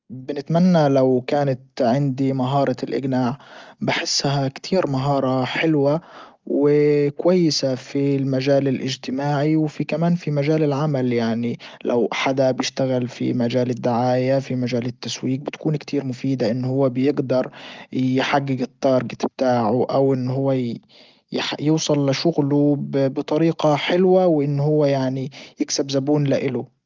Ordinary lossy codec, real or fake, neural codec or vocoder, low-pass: Opus, 32 kbps; real; none; 7.2 kHz